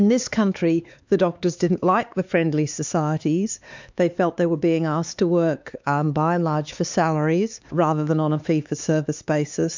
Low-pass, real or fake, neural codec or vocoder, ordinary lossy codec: 7.2 kHz; fake; codec, 16 kHz, 4 kbps, X-Codec, HuBERT features, trained on LibriSpeech; MP3, 64 kbps